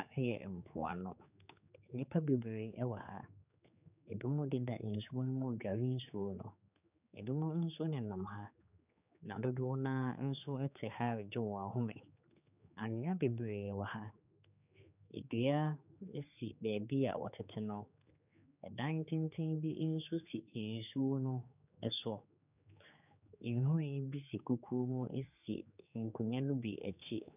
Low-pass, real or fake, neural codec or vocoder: 3.6 kHz; fake; codec, 16 kHz, 4 kbps, X-Codec, HuBERT features, trained on general audio